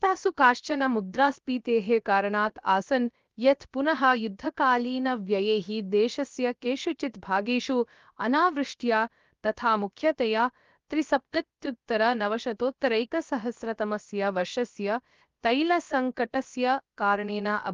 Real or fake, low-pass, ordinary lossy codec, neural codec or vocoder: fake; 7.2 kHz; Opus, 32 kbps; codec, 16 kHz, about 1 kbps, DyCAST, with the encoder's durations